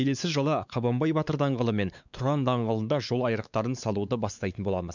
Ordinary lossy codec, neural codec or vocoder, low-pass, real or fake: none; codec, 16 kHz, 4 kbps, X-Codec, WavLM features, trained on Multilingual LibriSpeech; 7.2 kHz; fake